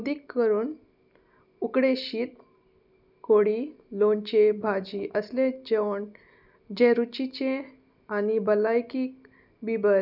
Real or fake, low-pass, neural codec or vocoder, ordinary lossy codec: real; 5.4 kHz; none; none